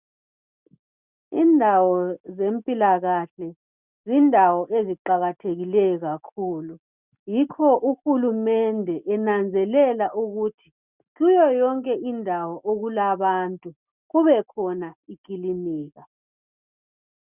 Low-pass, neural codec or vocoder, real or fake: 3.6 kHz; none; real